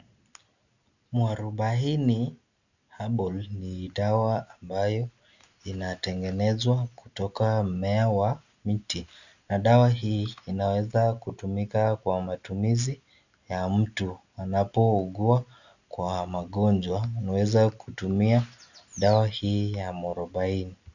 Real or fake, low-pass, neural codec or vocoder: real; 7.2 kHz; none